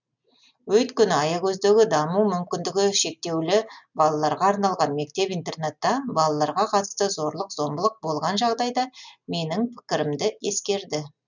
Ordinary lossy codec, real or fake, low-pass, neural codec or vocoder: none; real; 7.2 kHz; none